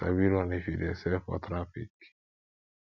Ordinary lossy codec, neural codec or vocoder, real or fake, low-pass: none; none; real; 7.2 kHz